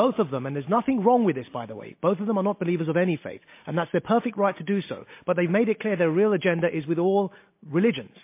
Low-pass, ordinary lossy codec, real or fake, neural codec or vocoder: 3.6 kHz; MP3, 24 kbps; real; none